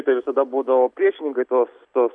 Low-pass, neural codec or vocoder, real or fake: 9.9 kHz; none; real